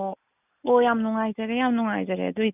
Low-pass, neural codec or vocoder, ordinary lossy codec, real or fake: 3.6 kHz; none; none; real